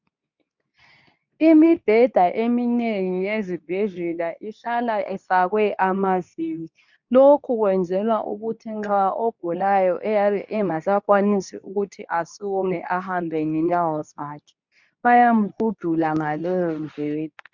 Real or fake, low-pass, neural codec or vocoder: fake; 7.2 kHz; codec, 24 kHz, 0.9 kbps, WavTokenizer, medium speech release version 2